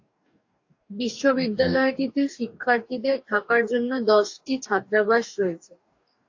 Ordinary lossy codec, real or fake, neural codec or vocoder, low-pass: AAC, 48 kbps; fake; codec, 44.1 kHz, 2.6 kbps, DAC; 7.2 kHz